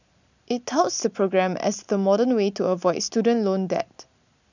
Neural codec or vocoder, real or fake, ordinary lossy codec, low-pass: none; real; none; 7.2 kHz